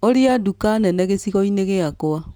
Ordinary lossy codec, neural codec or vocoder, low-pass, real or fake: none; none; none; real